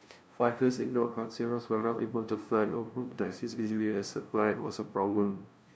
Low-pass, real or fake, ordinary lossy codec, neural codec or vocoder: none; fake; none; codec, 16 kHz, 0.5 kbps, FunCodec, trained on LibriTTS, 25 frames a second